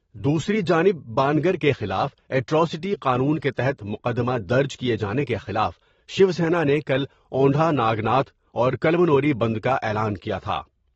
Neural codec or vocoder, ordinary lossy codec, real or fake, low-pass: vocoder, 44.1 kHz, 128 mel bands every 512 samples, BigVGAN v2; AAC, 24 kbps; fake; 19.8 kHz